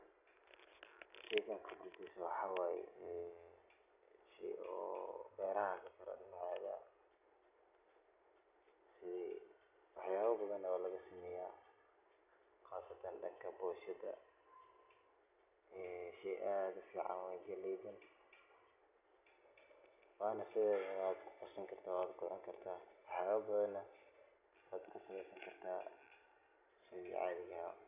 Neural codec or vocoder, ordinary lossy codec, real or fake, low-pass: none; none; real; 3.6 kHz